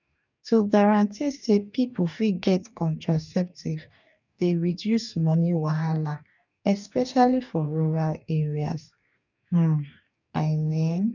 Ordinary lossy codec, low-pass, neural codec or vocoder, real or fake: none; 7.2 kHz; codec, 44.1 kHz, 2.6 kbps, DAC; fake